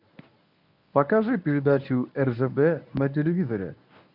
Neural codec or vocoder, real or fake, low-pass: codec, 24 kHz, 0.9 kbps, WavTokenizer, medium speech release version 1; fake; 5.4 kHz